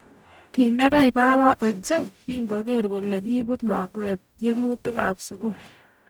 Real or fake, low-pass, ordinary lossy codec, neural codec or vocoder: fake; none; none; codec, 44.1 kHz, 0.9 kbps, DAC